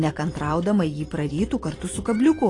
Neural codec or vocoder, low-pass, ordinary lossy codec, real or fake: vocoder, 44.1 kHz, 128 mel bands every 512 samples, BigVGAN v2; 10.8 kHz; AAC, 32 kbps; fake